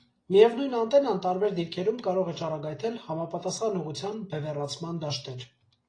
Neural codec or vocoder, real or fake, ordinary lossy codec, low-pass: none; real; AAC, 32 kbps; 9.9 kHz